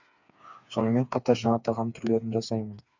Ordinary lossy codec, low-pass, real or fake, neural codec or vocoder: none; 7.2 kHz; fake; codec, 16 kHz, 4 kbps, FreqCodec, smaller model